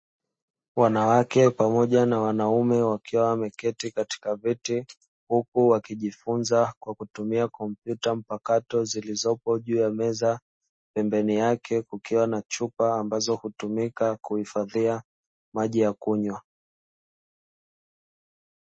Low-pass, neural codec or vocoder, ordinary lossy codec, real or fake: 9.9 kHz; none; MP3, 32 kbps; real